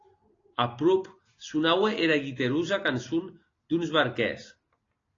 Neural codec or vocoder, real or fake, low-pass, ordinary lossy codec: none; real; 7.2 kHz; AAC, 32 kbps